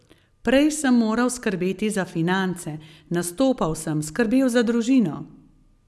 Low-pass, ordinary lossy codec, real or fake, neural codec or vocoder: none; none; real; none